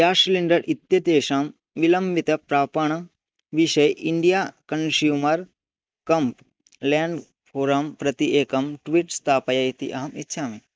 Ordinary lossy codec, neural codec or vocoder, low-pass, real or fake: none; none; none; real